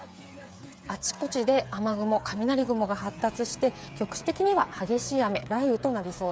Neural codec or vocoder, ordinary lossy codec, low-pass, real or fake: codec, 16 kHz, 8 kbps, FreqCodec, smaller model; none; none; fake